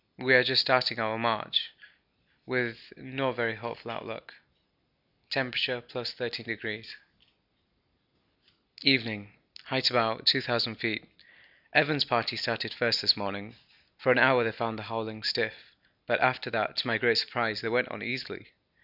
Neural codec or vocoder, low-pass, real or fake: none; 5.4 kHz; real